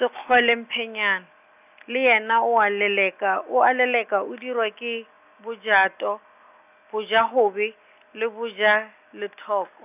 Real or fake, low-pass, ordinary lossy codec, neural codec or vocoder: real; 3.6 kHz; none; none